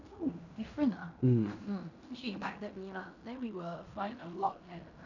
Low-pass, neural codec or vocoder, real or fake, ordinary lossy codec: 7.2 kHz; codec, 16 kHz in and 24 kHz out, 0.9 kbps, LongCat-Audio-Codec, fine tuned four codebook decoder; fake; none